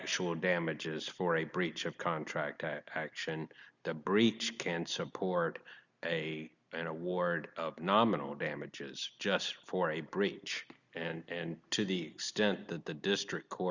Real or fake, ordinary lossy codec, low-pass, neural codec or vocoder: real; Opus, 64 kbps; 7.2 kHz; none